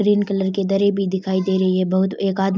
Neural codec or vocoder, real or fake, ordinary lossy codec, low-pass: none; real; none; none